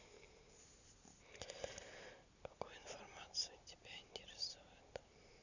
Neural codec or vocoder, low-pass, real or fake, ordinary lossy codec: none; 7.2 kHz; real; none